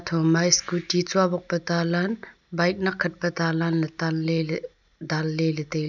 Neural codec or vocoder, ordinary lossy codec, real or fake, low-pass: none; none; real; 7.2 kHz